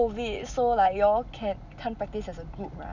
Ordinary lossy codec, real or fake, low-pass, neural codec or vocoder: none; real; 7.2 kHz; none